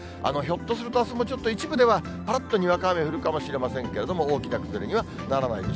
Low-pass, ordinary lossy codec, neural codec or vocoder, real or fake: none; none; none; real